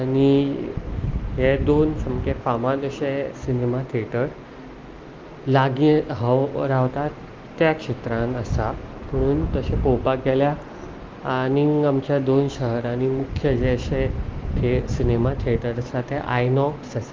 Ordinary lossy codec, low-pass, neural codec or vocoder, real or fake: Opus, 32 kbps; 7.2 kHz; none; real